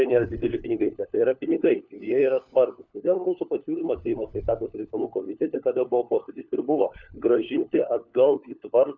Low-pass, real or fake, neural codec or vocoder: 7.2 kHz; fake; codec, 16 kHz, 4 kbps, FunCodec, trained on LibriTTS, 50 frames a second